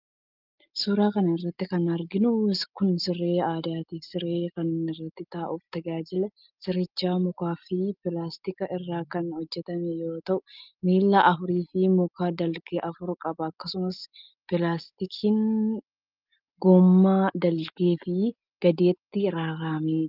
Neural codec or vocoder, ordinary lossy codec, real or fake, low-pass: none; Opus, 24 kbps; real; 5.4 kHz